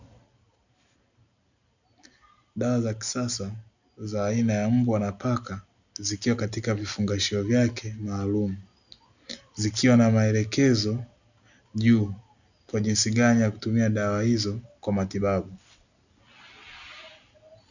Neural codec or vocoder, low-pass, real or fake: none; 7.2 kHz; real